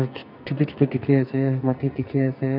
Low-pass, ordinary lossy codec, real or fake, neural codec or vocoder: 5.4 kHz; none; fake; codec, 44.1 kHz, 2.6 kbps, SNAC